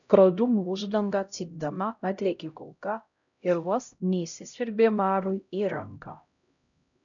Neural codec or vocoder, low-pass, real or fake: codec, 16 kHz, 0.5 kbps, X-Codec, HuBERT features, trained on LibriSpeech; 7.2 kHz; fake